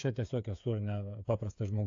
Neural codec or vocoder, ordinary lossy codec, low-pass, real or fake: codec, 16 kHz, 8 kbps, FreqCodec, smaller model; AAC, 48 kbps; 7.2 kHz; fake